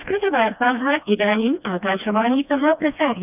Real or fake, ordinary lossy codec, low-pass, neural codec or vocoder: fake; none; 3.6 kHz; codec, 16 kHz, 1 kbps, FreqCodec, smaller model